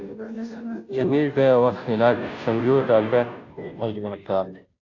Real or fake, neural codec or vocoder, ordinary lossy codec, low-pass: fake; codec, 16 kHz, 0.5 kbps, FunCodec, trained on Chinese and English, 25 frames a second; AAC, 48 kbps; 7.2 kHz